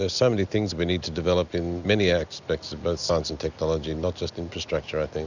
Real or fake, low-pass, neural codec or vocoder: real; 7.2 kHz; none